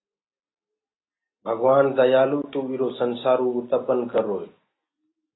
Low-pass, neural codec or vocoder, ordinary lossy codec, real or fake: 7.2 kHz; none; AAC, 16 kbps; real